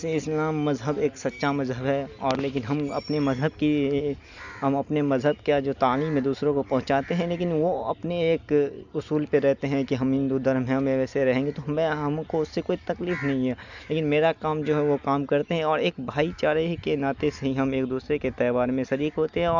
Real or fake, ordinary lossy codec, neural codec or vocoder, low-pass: real; none; none; 7.2 kHz